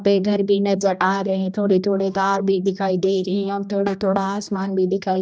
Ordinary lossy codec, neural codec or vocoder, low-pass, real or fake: none; codec, 16 kHz, 1 kbps, X-Codec, HuBERT features, trained on general audio; none; fake